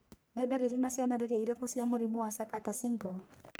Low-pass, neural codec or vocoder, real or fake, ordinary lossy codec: none; codec, 44.1 kHz, 1.7 kbps, Pupu-Codec; fake; none